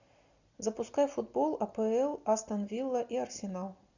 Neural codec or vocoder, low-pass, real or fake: none; 7.2 kHz; real